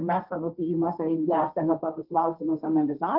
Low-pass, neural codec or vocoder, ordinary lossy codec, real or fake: 5.4 kHz; codec, 16 kHz, 1.1 kbps, Voila-Tokenizer; Opus, 32 kbps; fake